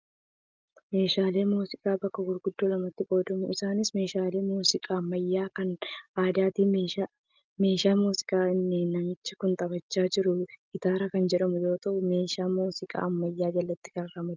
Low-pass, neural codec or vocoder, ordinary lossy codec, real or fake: 7.2 kHz; none; Opus, 24 kbps; real